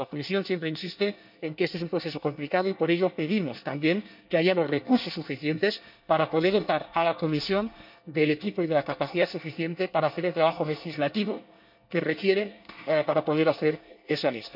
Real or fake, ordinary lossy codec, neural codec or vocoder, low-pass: fake; none; codec, 24 kHz, 1 kbps, SNAC; 5.4 kHz